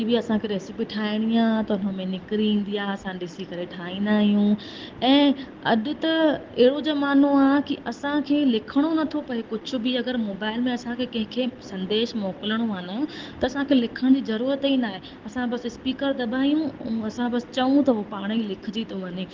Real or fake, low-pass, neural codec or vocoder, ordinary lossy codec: real; 7.2 kHz; none; Opus, 16 kbps